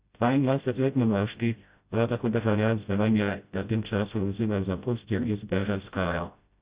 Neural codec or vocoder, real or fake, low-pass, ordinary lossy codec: codec, 16 kHz, 0.5 kbps, FreqCodec, smaller model; fake; 3.6 kHz; Opus, 64 kbps